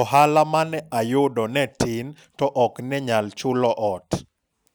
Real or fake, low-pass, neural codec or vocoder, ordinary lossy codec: real; none; none; none